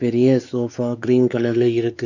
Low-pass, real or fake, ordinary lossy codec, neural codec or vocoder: 7.2 kHz; fake; none; codec, 16 kHz, 4 kbps, X-Codec, WavLM features, trained on Multilingual LibriSpeech